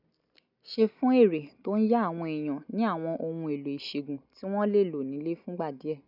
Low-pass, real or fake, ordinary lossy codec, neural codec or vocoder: 5.4 kHz; real; none; none